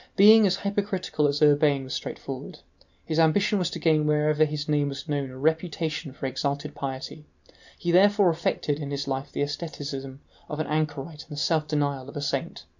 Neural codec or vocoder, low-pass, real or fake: none; 7.2 kHz; real